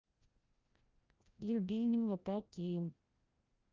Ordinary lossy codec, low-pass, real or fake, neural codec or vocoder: Opus, 32 kbps; 7.2 kHz; fake; codec, 16 kHz, 0.5 kbps, FreqCodec, larger model